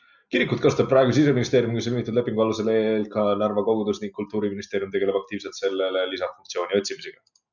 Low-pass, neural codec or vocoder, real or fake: 7.2 kHz; none; real